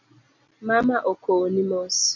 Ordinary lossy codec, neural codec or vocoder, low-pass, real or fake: MP3, 48 kbps; none; 7.2 kHz; real